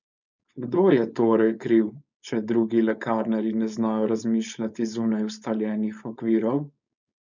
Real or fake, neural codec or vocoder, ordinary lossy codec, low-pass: fake; codec, 16 kHz, 4.8 kbps, FACodec; none; 7.2 kHz